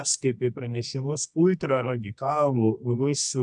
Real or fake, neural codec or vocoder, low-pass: fake; codec, 24 kHz, 0.9 kbps, WavTokenizer, medium music audio release; 10.8 kHz